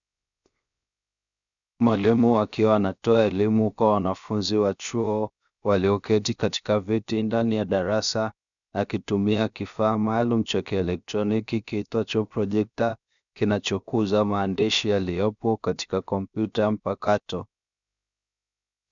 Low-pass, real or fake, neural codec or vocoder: 7.2 kHz; fake; codec, 16 kHz, 0.7 kbps, FocalCodec